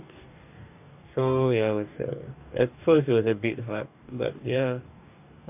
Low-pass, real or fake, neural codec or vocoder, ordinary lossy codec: 3.6 kHz; fake; codec, 44.1 kHz, 2.6 kbps, DAC; AAC, 32 kbps